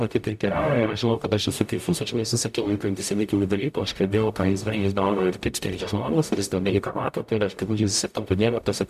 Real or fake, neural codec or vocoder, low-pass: fake; codec, 44.1 kHz, 0.9 kbps, DAC; 14.4 kHz